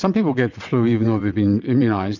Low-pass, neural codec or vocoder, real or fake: 7.2 kHz; vocoder, 22.05 kHz, 80 mel bands, WaveNeXt; fake